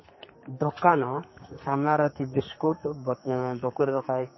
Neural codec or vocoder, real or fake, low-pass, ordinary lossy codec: codec, 44.1 kHz, 2.6 kbps, SNAC; fake; 7.2 kHz; MP3, 24 kbps